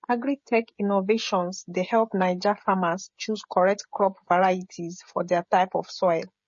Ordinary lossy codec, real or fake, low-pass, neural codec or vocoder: MP3, 32 kbps; fake; 7.2 kHz; codec, 16 kHz, 16 kbps, FreqCodec, smaller model